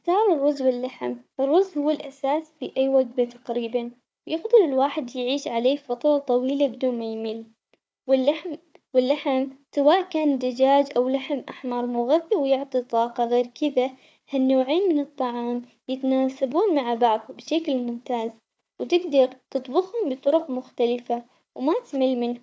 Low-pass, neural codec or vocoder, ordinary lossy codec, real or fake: none; codec, 16 kHz, 4 kbps, FunCodec, trained on Chinese and English, 50 frames a second; none; fake